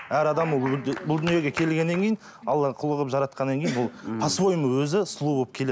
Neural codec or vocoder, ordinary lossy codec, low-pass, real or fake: none; none; none; real